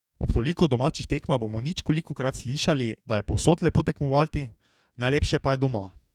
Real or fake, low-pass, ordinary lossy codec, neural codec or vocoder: fake; 19.8 kHz; none; codec, 44.1 kHz, 2.6 kbps, DAC